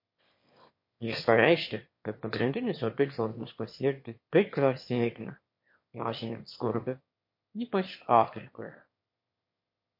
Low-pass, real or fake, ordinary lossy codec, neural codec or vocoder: 5.4 kHz; fake; MP3, 32 kbps; autoencoder, 22.05 kHz, a latent of 192 numbers a frame, VITS, trained on one speaker